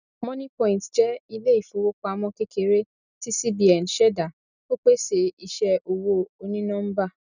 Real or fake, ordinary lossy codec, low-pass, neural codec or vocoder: real; none; 7.2 kHz; none